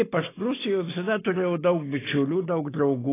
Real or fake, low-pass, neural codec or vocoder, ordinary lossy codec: real; 3.6 kHz; none; AAC, 16 kbps